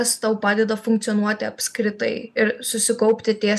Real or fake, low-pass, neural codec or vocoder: real; 14.4 kHz; none